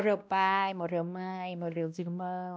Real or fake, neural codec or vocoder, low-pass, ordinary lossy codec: fake; codec, 16 kHz, 1 kbps, X-Codec, WavLM features, trained on Multilingual LibriSpeech; none; none